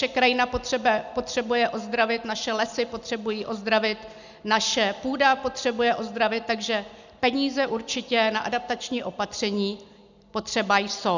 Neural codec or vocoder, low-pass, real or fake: none; 7.2 kHz; real